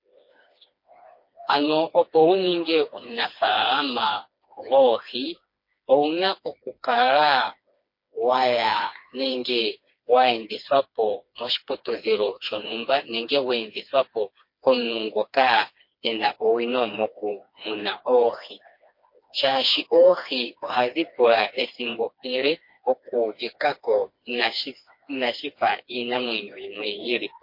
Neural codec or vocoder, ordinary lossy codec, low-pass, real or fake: codec, 16 kHz, 2 kbps, FreqCodec, smaller model; MP3, 32 kbps; 5.4 kHz; fake